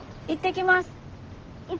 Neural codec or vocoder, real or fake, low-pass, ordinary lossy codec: none; real; 7.2 kHz; Opus, 16 kbps